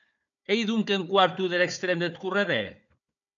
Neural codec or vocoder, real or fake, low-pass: codec, 16 kHz, 4 kbps, FunCodec, trained on Chinese and English, 50 frames a second; fake; 7.2 kHz